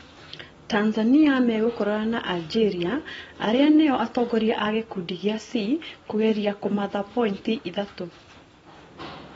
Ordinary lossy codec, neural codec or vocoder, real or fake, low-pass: AAC, 24 kbps; none; real; 10.8 kHz